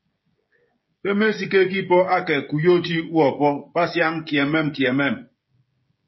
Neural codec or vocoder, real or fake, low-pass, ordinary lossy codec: codec, 16 kHz, 16 kbps, FreqCodec, smaller model; fake; 7.2 kHz; MP3, 24 kbps